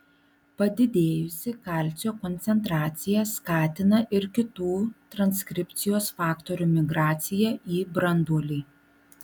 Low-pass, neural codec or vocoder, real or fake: 19.8 kHz; none; real